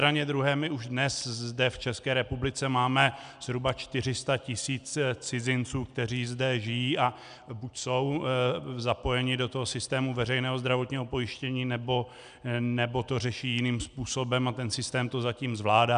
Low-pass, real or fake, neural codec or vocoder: 9.9 kHz; real; none